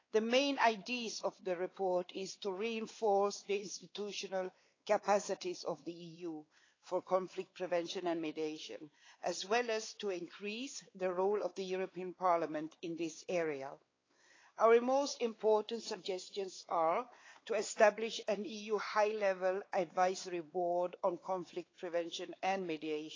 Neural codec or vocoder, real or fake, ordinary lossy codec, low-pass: codec, 16 kHz, 4 kbps, X-Codec, WavLM features, trained on Multilingual LibriSpeech; fake; AAC, 32 kbps; 7.2 kHz